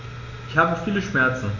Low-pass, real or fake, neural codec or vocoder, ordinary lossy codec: 7.2 kHz; real; none; none